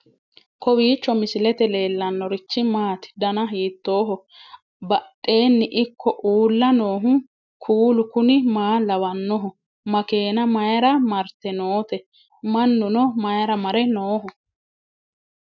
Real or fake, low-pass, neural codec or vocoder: real; 7.2 kHz; none